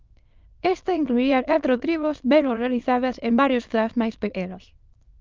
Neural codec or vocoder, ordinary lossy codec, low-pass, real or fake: autoencoder, 22.05 kHz, a latent of 192 numbers a frame, VITS, trained on many speakers; Opus, 24 kbps; 7.2 kHz; fake